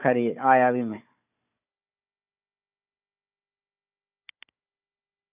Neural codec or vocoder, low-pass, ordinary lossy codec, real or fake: codec, 16 kHz, 4 kbps, FunCodec, trained on Chinese and English, 50 frames a second; 3.6 kHz; none; fake